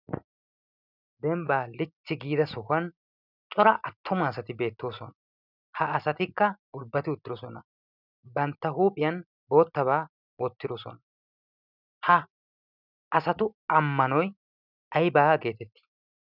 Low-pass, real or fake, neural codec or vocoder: 5.4 kHz; real; none